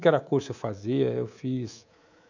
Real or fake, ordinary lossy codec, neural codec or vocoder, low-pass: fake; none; codec, 24 kHz, 3.1 kbps, DualCodec; 7.2 kHz